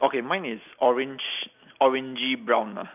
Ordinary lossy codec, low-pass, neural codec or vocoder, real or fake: none; 3.6 kHz; none; real